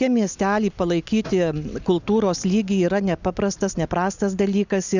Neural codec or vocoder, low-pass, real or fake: none; 7.2 kHz; real